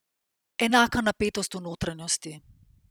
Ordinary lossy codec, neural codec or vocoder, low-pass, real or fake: none; vocoder, 44.1 kHz, 128 mel bands every 256 samples, BigVGAN v2; none; fake